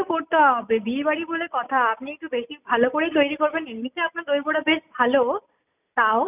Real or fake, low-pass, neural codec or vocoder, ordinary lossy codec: real; 3.6 kHz; none; none